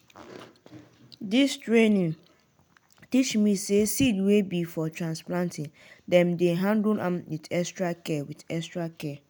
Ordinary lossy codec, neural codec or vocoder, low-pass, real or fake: none; none; none; real